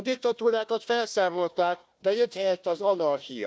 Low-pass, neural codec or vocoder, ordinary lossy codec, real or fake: none; codec, 16 kHz, 1 kbps, FunCodec, trained on Chinese and English, 50 frames a second; none; fake